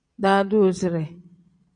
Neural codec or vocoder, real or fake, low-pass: vocoder, 22.05 kHz, 80 mel bands, Vocos; fake; 9.9 kHz